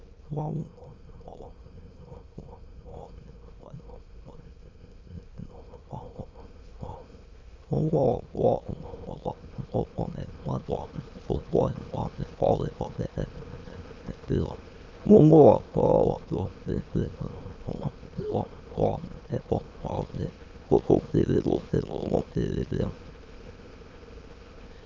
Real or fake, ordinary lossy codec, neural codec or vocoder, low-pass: fake; Opus, 32 kbps; autoencoder, 22.05 kHz, a latent of 192 numbers a frame, VITS, trained on many speakers; 7.2 kHz